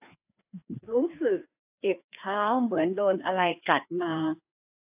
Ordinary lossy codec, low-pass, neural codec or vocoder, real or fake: AAC, 32 kbps; 3.6 kHz; codec, 16 kHz, 2 kbps, FreqCodec, larger model; fake